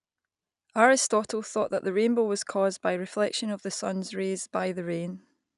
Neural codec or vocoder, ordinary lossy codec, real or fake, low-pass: none; none; real; 10.8 kHz